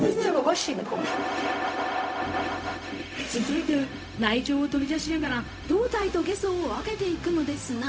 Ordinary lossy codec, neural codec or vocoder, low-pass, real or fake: none; codec, 16 kHz, 0.4 kbps, LongCat-Audio-Codec; none; fake